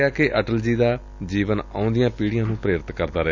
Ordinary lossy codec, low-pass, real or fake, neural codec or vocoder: none; 7.2 kHz; real; none